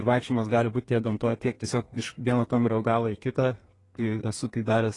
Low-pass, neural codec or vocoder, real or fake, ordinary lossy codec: 10.8 kHz; codec, 32 kHz, 1.9 kbps, SNAC; fake; AAC, 32 kbps